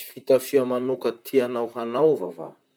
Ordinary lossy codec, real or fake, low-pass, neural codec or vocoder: none; fake; none; vocoder, 44.1 kHz, 128 mel bands, Pupu-Vocoder